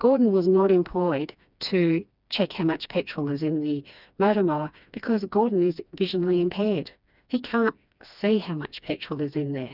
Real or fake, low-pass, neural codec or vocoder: fake; 5.4 kHz; codec, 16 kHz, 2 kbps, FreqCodec, smaller model